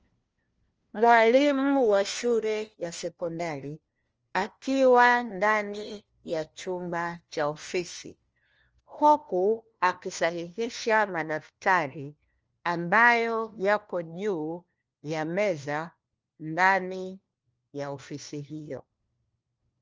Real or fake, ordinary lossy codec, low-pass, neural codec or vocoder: fake; Opus, 24 kbps; 7.2 kHz; codec, 16 kHz, 1 kbps, FunCodec, trained on LibriTTS, 50 frames a second